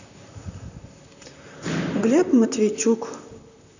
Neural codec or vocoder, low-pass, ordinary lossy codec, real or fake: vocoder, 44.1 kHz, 128 mel bands, Pupu-Vocoder; 7.2 kHz; none; fake